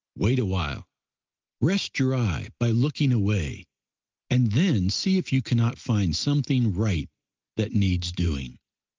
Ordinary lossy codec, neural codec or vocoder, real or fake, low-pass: Opus, 32 kbps; none; real; 7.2 kHz